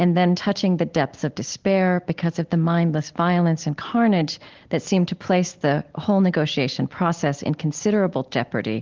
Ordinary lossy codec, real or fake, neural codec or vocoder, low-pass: Opus, 16 kbps; real; none; 7.2 kHz